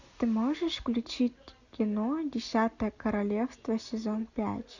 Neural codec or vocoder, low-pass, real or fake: none; 7.2 kHz; real